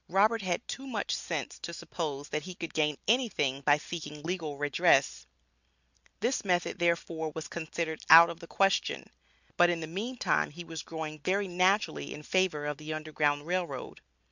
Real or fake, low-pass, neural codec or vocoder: real; 7.2 kHz; none